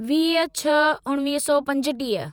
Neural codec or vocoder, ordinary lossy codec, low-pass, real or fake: vocoder, 48 kHz, 128 mel bands, Vocos; none; 19.8 kHz; fake